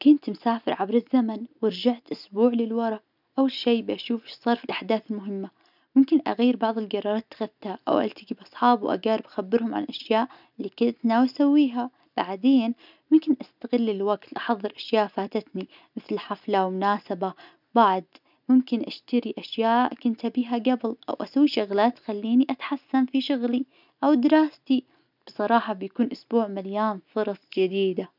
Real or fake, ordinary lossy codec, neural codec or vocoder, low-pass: real; none; none; 5.4 kHz